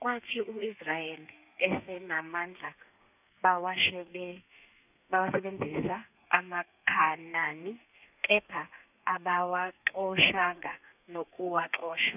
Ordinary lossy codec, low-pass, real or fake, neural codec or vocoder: none; 3.6 kHz; fake; codec, 32 kHz, 1.9 kbps, SNAC